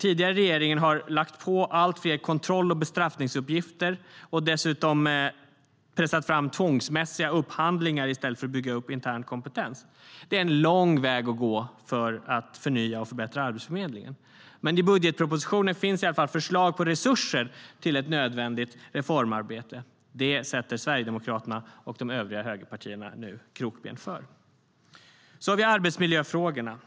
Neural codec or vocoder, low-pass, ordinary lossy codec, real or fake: none; none; none; real